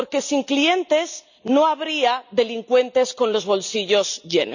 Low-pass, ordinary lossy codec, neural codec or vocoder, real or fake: 7.2 kHz; none; none; real